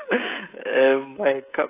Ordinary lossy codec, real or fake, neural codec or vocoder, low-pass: AAC, 16 kbps; fake; codec, 16 kHz, 16 kbps, FreqCodec, smaller model; 3.6 kHz